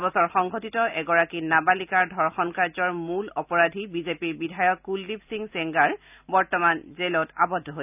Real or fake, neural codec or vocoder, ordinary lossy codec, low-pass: real; none; none; 3.6 kHz